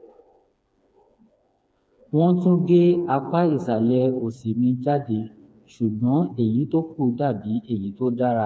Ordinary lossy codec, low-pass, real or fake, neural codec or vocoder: none; none; fake; codec, 16 kHz, 4 kbps, FreqCodec, smaller model